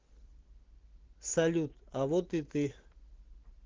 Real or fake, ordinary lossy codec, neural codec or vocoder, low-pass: real; Opus, 16 kbps; none; 7.2 kHz